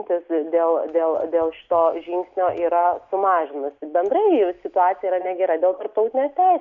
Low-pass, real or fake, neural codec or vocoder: 7.2 kHz; real; none